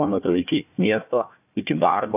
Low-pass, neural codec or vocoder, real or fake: 3.6 kHz; codec, 16 kHz, 1 kbps, FunCodec, trained on Chinese and English, 50 frames a second; fake